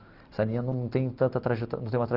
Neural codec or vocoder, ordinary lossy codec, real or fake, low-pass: none; Opus, 16 kbps; real; 5.4 kHz